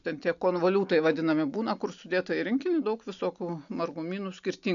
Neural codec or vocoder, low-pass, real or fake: none; 7.2 kHz; real